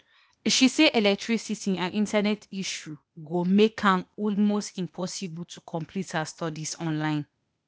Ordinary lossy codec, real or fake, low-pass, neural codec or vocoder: none; fake; none; codec, 16 kHz, 0.8 kbps, ZipCodec